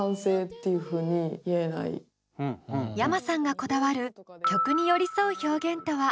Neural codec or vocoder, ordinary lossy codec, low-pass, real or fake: none; none; none; real